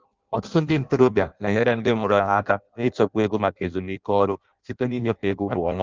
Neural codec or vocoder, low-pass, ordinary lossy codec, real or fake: codec, 16 kHz in and 24 kHz out, 0.6 kbps, FireRedTTS-2 codec; 7.2 kHz; Opus, 24 kbps; fake